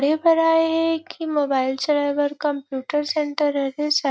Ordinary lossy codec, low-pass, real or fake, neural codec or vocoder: none; none; real; none